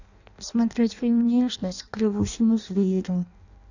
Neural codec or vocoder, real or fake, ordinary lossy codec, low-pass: codec, 16 kHz in and 24 kHz out, 0.6 kbps, FireRedTTS-2 codec; fake; none; 7.2 kHz